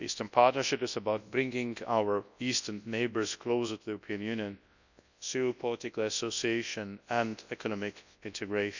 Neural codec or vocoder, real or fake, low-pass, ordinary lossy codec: codec, 24 kHz, 0.9 kbps, WavTokenizer, large speech release; fake; 7.2 kHz; none